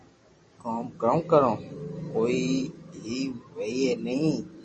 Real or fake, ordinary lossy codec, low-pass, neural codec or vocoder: fake; MP3, 32 kbps; 10.8 kHz; vocoder, 44.1 kHz, 128 mel bands every 256 samples, BigVGAN v2